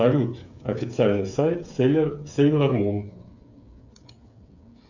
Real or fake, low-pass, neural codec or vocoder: fake; 7.2 kHz; codec, 16 kHz, 8 kbps, FreqCodec, smaller model